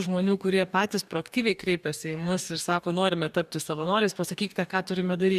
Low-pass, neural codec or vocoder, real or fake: 14.4 kHz; codec, 44.1 kHz, 2.6 kbps, DAC; fake